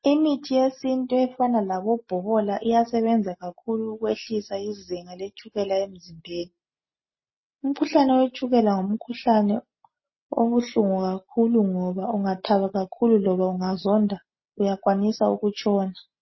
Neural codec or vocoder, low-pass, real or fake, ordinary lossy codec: none; 7.2 kHz; real; MP3, 24 kbps